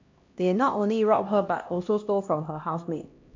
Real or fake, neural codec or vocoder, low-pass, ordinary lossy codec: fake; codec, 16 kHz, 1 kbps, X-Codec, HuBERT features, trained on LibriSpeech; 7.2 kHz; MP3, 48 kbps